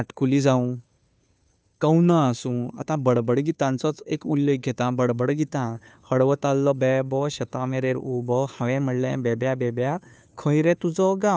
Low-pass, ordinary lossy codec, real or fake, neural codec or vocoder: none; none; fake; codec, 16 kHz, 2 kbps, FunCodec, trained on Chinese and English, 25 frames a second